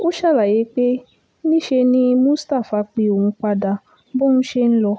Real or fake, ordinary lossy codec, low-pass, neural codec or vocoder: real; none; none; none